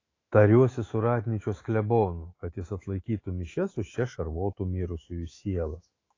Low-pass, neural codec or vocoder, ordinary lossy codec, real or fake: 7.2 kHz; autoencoder, 48 kHz, 128 numbers a frame, DAC-VAE, trained on Japanese speech; AAC, 32 kbps; fake